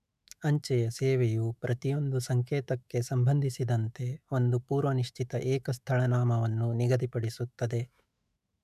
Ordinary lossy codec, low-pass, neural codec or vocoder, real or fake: none; 14.4 kHz; autoencoder, 48 kHz, 128 numbers a frame, DAC-VAE, trained on Japanese speech; fake